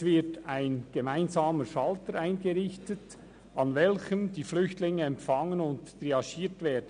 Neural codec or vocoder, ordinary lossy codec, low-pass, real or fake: none; none; 9.9 kHz; real